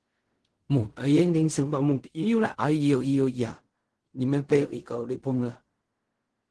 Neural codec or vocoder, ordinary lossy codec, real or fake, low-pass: codec, 16 kHz in and 24 kHz out, 0.4 kbps, LongCat-Audio-Codec, fine tuned four codebook decoder; Opus, 16 kbps; fake; 10.8 kHz